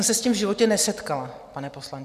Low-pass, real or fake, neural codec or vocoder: 14.4 kHz; real; none